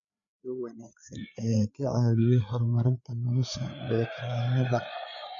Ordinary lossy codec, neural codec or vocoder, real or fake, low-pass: none; codec, 16 kHz, 4 kbps, FreqCodec, larger model; fake; 7.2 kHz